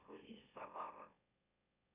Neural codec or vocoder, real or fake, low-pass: autoencoder, 44.1 kHz, a latent of 192 numbers a frame, MeloTTS; fake; 3.6 kHz